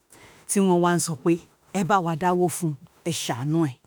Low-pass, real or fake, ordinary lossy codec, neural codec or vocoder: none; fake; none; autoencoder, 48 kHz, 32 numbers a frame, DAC-VAE, trained on Japanese speech